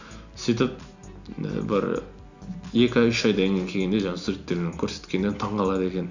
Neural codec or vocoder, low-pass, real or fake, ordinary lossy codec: none; 7.2 kHz; real; none